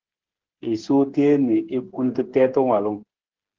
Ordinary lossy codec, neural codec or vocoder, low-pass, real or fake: Opus, 16 kbps; codec, 16 kHz, 8 kbps, FreqCodec, smaller model; 7.2 kHz; fake